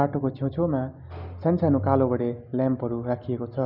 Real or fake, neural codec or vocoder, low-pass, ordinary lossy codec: real; none; 5.4 kHz; Opus, 64 kbps